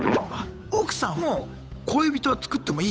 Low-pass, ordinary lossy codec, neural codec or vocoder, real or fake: none; none; codec, 16 kHz, 8 kbps, FunCodec, trained on Chinese and English, 25 frames a second; fake